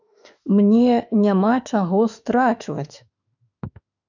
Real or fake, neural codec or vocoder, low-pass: fake; autoencoder, 48 kHz, 32 numbers a frame, DAC-VAE, trained on Japanese speech; 7.2 kHz